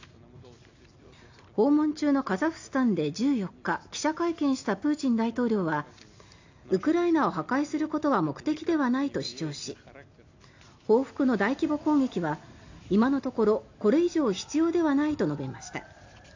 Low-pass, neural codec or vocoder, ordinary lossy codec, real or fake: 7.2 kHz; none; AAC, 48 kbps; real